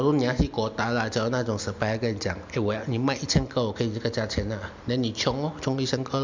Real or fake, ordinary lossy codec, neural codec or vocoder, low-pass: real; MP3, 64 kbps; none; 7.2 kHz